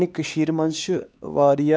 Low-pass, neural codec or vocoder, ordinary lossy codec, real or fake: none; codec, 16 kHz, 2 kbps, X-Codec, HuBERT features, trained on LibriSpeech; none; fake